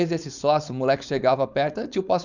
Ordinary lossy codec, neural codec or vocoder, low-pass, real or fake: none; codec, 16 kHz, 4.8 kbps, FACodec; 7.2 kHz; fake